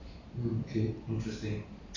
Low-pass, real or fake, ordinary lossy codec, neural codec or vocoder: 7.2 kHz; real; AAC, 32 kbps; none